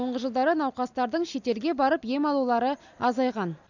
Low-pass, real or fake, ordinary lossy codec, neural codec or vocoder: 7.2 kHz; real; none; none